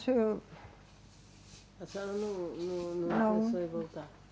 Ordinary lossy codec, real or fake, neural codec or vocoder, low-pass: none; real; none; none